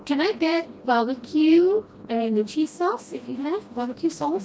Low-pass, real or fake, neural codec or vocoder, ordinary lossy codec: none; fake; codec, 16 kHz, 1 kbps, FreqCodec, smaller model; none